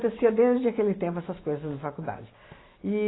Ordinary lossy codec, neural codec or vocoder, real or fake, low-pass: AAC, 16 kbps; none; real; 7.2 kHz